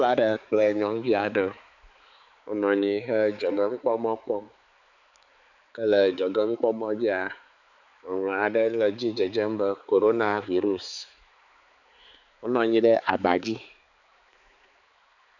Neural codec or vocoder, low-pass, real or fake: codec, 16 kHz, 4 kbps, X-Codec, HuBERT features, trained on balanced general audio; 7.2 kHz; fake